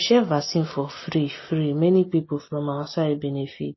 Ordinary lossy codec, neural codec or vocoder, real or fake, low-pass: MP3, 24 kbps; none; real; 7.2 kHz